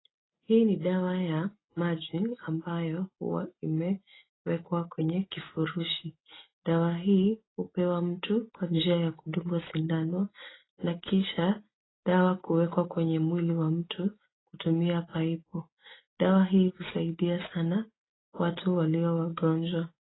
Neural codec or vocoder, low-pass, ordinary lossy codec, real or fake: none; 7.2 kHz; AAC, 16 kbps; real